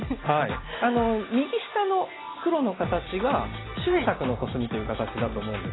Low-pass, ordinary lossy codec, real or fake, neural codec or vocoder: 7.2 kHz; AAC, 16 kbps; real; none